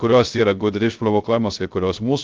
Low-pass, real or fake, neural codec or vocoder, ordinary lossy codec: 7.2 kHz; fake; codec, 16 kHz, 0.3 kbps, FocalCodec; Opus, 32 kbps